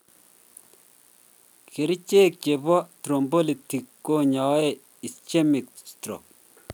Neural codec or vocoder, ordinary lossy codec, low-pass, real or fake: none; none; none; real